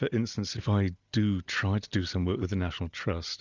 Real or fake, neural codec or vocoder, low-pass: real; none; 7.2 kHz